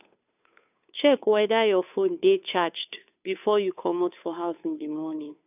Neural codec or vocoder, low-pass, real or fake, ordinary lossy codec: codec, 16 kHz, 2 kbps, FunCodec, trained on Chinese and English, 25 frames a second; 3.6 kHz; fake; none